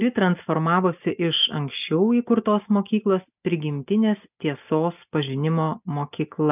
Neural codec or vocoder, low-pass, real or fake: none; 3.6 kHz; real